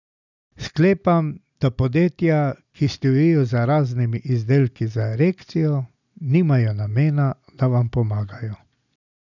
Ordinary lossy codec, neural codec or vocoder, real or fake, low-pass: none; none; real; 7.2 kHz